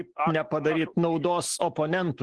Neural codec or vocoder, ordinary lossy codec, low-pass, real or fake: none; Opus, 16 kbps; 10.8 kHz; real